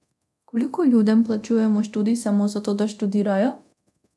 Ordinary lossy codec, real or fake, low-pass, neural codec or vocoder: none; fake; none; codec, 24 kHz, 0.9 kbps, DualCodec